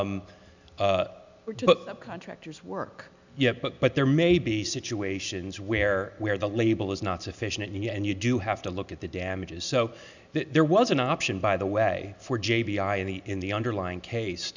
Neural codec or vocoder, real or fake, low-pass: none; real; 7.2 kHz